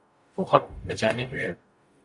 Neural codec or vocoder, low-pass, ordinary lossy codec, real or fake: codec, 44.1 kHz, 0.9 kbps, DAC; 10.8 kHz; AAC, 64 kbps; fake